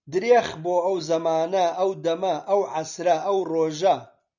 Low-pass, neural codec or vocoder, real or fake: 7.2 kHz; none; real